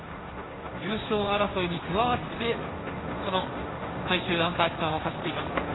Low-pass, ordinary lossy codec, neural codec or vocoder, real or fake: 7.2 kHz; AAC, 16 kbps; codec, 16 kHz, 1.1 kbps, Voila-Tokenizer; fake